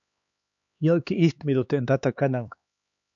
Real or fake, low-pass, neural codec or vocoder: fake; 7.2 kHz; codec, 16 kHz, 2 kbps, X-Codec, HuBERT features, trained on LibriSpeech